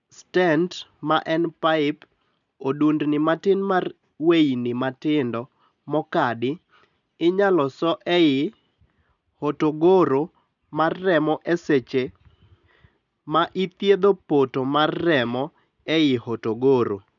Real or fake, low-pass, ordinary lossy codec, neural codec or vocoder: real; 7.2 kHz; none; none